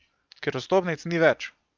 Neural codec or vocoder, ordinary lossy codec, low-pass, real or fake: none; Opus, 24 kbps; 7.2 kHz; real